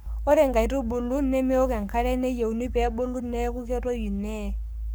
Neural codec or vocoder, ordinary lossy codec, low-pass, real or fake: codec, 44.1 kHz, 7.8 kbps, Pupu-Codec; none; none; fake